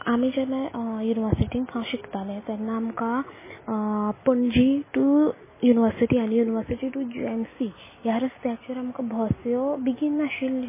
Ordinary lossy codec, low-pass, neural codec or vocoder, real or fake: MP3, 16 kbps; 3.6 kHz; none; real